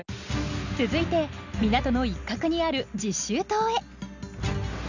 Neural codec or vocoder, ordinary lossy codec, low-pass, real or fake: none; none; 7.2 kHz; real